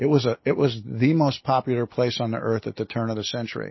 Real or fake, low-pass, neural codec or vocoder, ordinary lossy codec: real; 7.2 kHz; none; MP3, 24 kbps